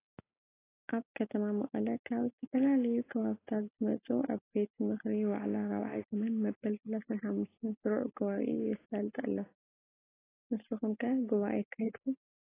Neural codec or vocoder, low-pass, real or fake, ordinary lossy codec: none; 3.6 kHz; real; AAC, 16 kbps